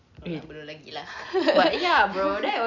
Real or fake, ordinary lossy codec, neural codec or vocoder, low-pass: real; none; none; 7.2 kHz